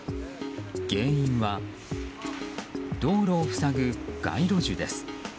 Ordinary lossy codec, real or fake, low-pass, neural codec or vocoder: none; real; none; none